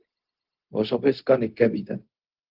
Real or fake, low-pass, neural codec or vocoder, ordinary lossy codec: fake; 5.4 kHz; codec, 16 kHz, 0.4 kbps, LongCat-Audio-Codec; Opus, 16 kbps